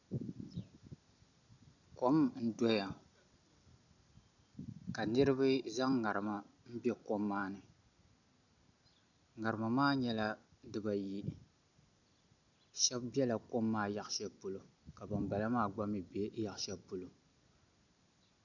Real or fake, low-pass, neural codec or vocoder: real; 7.2 kHz; none